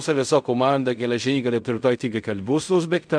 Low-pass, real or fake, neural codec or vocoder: 9.9 kHz; fake; codec, 16 kHz in and 24 kHz out, 0.4 kbps, LongCat-Audio-Codec, fine tuned four codebook decoder